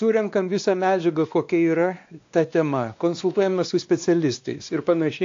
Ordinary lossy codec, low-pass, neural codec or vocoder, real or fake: AAC, 48 kbps; 7.2 kHz; codec, 16 kHz, 2 kbps, X-Codec, WavLM features, trained on Multilingual LibriSpeech; fake